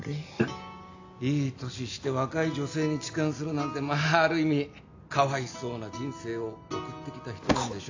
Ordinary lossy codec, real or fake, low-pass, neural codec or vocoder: AAC, 48 kbps; real; 7.2 kHz; none